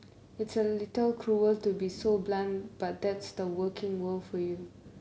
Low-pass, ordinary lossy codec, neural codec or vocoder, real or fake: none; none; none; real